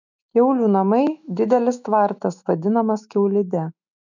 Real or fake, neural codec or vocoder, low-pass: fake; autoencoder, 48 kHz, 128 numbers a frame, DAC-VAE, trained on Japanese speech; 7.2 kHz